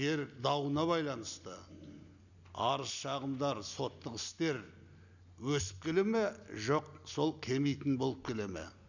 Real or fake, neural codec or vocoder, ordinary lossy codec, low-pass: real; none; none; 7.2 kHz